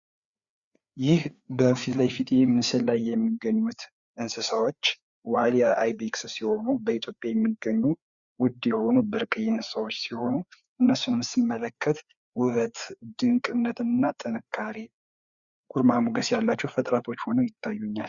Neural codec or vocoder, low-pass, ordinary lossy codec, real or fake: codec, 16 kHz, 4 kbps, FreqCodec, larger model; 7.2 kHz; Opus, 64 kbps; fake